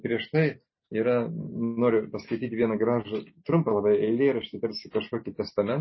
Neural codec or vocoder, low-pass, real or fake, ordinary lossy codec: none; 7.2 kHz; real; MP3, 24 kbps